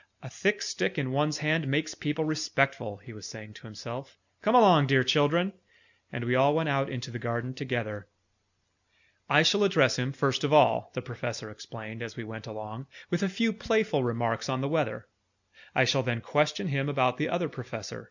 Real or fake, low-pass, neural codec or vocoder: real; 7.2 kHz; none